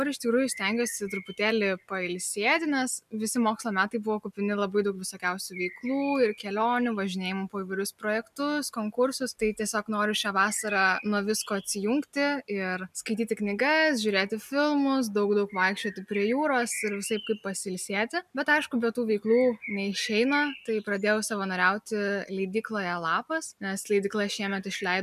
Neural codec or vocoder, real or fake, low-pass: none; real; 14.4 kHz